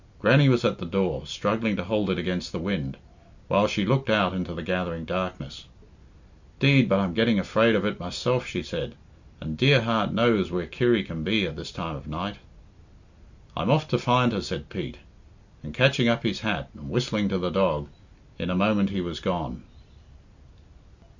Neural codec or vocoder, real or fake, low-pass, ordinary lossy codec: none; real; 7.2 kHz; Opus, 64 kbps